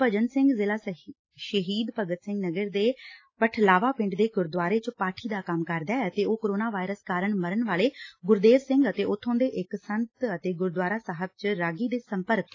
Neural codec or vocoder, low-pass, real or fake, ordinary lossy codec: none; 7.2 kHz; real; AAC, 32 kbps